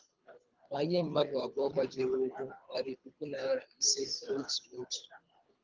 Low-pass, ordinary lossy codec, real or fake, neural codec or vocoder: 7.2 kHz; Opus, 32 kbps; fake; codec, 24 kHz, 3 kbps, HILCodec